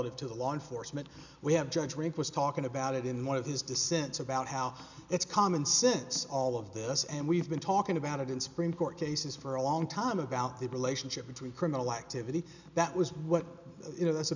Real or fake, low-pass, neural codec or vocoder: real; 7.2 kHz; none